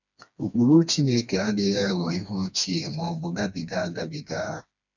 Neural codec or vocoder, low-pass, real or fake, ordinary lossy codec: codec, 16 kHz, 2 kbps, FreqCodec, smaller model; 7.2 kHz; fake; none